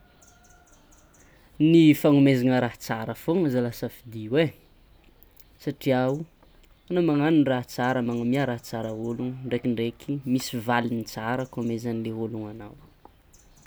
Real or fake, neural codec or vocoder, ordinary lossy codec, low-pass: real; none; none; none